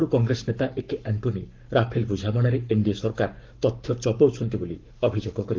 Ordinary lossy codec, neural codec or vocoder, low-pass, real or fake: Opus, 32 kbps; codec, 44.1 kHz, 7.8 kbps, Pupu-Codec; 7.2 kHz; fake